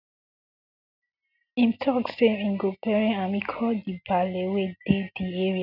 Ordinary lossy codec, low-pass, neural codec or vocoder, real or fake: none; 5.4 kHz; none; real